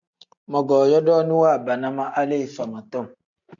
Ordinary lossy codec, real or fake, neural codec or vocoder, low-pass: MP3, 96 kbps; real; none; 7.2 kHz